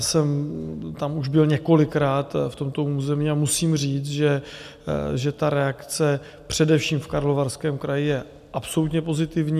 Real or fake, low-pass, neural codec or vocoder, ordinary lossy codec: real; 14.4 kHz; none; AAC, 96 kbps